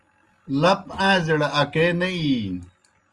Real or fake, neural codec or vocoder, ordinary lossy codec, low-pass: real; none; Opus, 32 kbps; 10.8 kHz